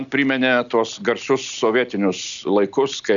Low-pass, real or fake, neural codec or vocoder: 7.2 kHz; real; none